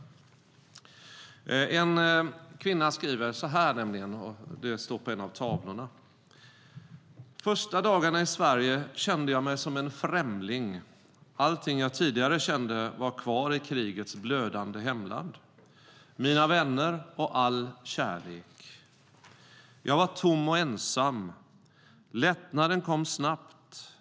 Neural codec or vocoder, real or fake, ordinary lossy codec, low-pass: none; real; none; none